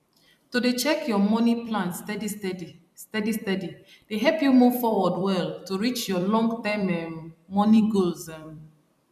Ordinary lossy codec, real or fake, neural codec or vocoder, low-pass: none; fake; vocoder, 44.1 kHz, 128 mel bands every 256 samples, BigVGAN v2; 14.4 kHz